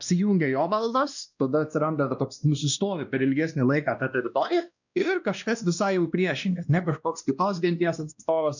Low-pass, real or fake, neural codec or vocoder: 7.2 kHz; fake; codec, 16 kHz, 1 kbps, X-Codec, WavLM features, trained on Multilingual LibriSpeech